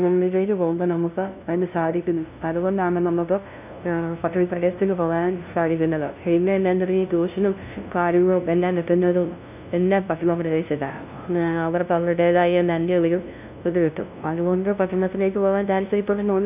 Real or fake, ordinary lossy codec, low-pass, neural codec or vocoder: fake; none; 3.6 kHz; codec, 16 kHz, 0.5 kbps, FunCodec, trained on LibriTTS, 25 frames a second